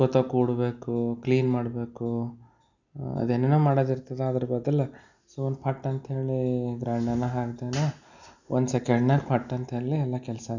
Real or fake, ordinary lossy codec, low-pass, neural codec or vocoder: real; none; 7.2 kHz; none